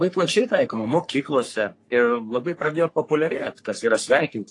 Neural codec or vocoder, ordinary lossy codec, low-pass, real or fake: codec, 44.1 kHz, 3.4 kbps, Pupu-Codec; AAC, 48 kbps; 10.8 kHz; fake